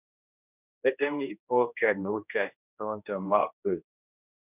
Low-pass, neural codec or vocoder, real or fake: 3.6 kHz; codec, 16 kHz, 1 kbps, X-Codec, HuBERT features, trained on general audio; fake